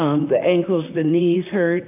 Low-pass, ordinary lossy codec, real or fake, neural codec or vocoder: 3.6 kHz; AAC, 32 kbps; fake; vocoder, 44.1 kHz, 128 mel bands, Pupu-Vocoder